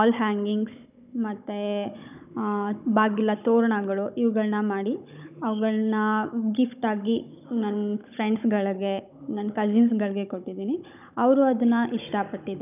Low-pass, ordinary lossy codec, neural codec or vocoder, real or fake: 3.6 kHz; none; codec, 16 kHz, 16 kbps, FunCodec, trained on Chinese and English, 50 frames a second; fake